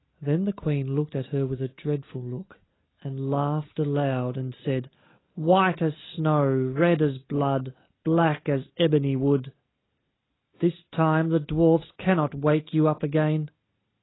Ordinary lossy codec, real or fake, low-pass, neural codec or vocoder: AAC, 16 kbps; real; 7.2 kHz; none